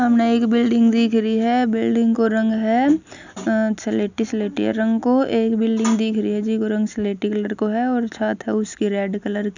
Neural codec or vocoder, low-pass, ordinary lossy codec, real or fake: none; 7.2 kHz; none; real